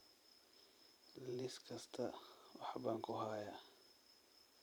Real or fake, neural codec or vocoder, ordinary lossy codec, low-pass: fake; vocoder, 44.1 kHz, 128 mel bands every 512 samples, BigVGAN v2; none; none